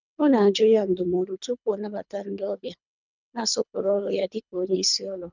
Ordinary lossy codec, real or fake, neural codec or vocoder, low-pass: none; fake; codec, 24 kHz, 3 kbps, HILCodec; 7.2 kHz